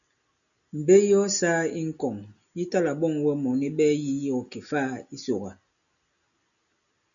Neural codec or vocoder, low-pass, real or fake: none; 7.2 kHz; real